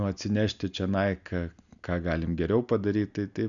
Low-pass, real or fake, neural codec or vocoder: 7.2 kHz; real; none